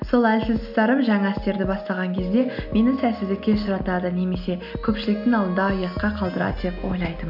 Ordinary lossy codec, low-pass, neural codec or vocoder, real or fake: none; 5.4 kHz; none; real